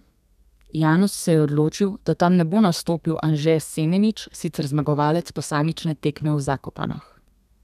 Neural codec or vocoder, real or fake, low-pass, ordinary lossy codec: codec, 32 kHz, 1.9 kbps, SNAC; fake; 14.4 kHz; none